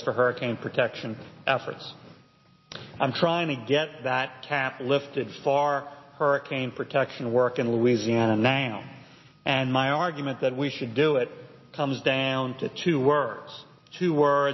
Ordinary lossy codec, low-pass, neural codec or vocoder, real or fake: MP3, 24 kbps; 7.2 kHz; none; real